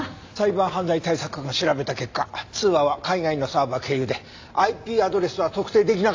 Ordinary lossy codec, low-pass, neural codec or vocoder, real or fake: AAC, 48 kbps; 7.2 kHz; none; real